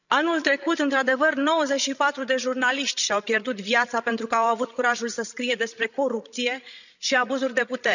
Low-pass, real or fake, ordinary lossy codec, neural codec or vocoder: 7.2 kHz; fake; none; codec, 16 kHz, 16 kbps, FreqCodec, larger model